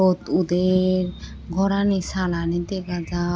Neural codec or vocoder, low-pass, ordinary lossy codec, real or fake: none; none; none; real